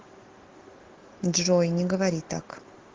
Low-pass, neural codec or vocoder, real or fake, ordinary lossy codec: 7.2 kHz; none; real; Opus, 16 kbps